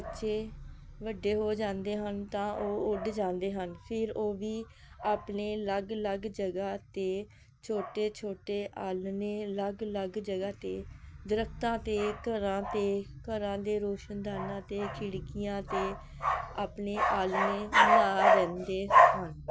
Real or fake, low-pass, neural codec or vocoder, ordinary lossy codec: real; none; none; none